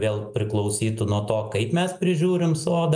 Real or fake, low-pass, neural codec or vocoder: real; 9.9 kHz; none